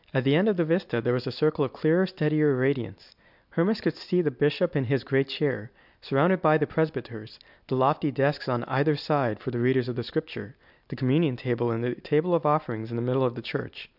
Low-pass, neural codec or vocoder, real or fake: 5.4 kHz; none; real